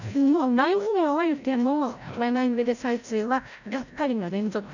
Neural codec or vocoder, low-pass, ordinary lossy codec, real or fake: codec, 16 kHz, 0.5 kbps, FreqCodec, larger model; 7.2 kHz; none; fake